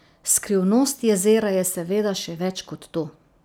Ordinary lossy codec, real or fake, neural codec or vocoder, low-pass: none; real; none; none